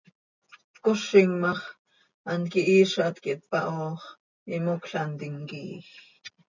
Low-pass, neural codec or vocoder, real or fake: 7.2 kHz; none; real